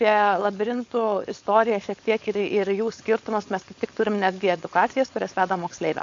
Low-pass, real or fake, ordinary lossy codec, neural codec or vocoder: 7.2 kHz; fake; AAC, 48 kbps; codec, 16 kHz, 4.8 kbps, FACodec